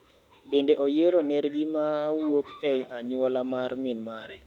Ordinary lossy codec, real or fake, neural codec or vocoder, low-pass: none; fake; autoencoder, 48 kHz, 32 numbers a frame, DAC-VAE, trained on Japanese speech; 19.8 kHz